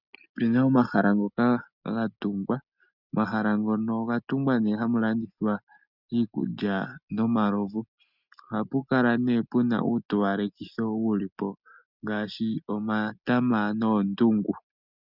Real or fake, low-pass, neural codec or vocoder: real; 5.4 kHz; none